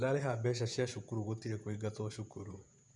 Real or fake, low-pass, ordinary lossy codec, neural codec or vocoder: fake; 10.8 kHz; none; vocoder, 48 kHz, 128 mel bands, Vocos